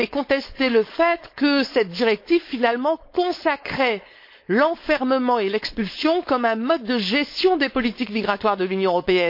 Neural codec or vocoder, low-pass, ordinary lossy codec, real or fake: codec, 16 kHz, 4.8 kbps, FACodec; 5.4 kHz; MP3, 32 kbps; fake